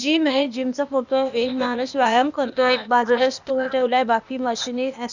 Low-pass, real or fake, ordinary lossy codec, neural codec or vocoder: 7.2 kHz; fake; none; codec, 16 kHz, 0.8 kbps, ZipCodec